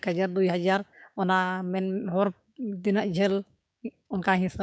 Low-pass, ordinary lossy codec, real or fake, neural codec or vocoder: none; none; fake; codec, 16 kHz, 6 kbps, DAC